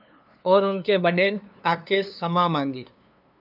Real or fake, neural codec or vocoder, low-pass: fake; codec, 16 kHz, 2 kbps, FunCodec, trained on LibriTTS, 25 frames a second; 5.4 kHz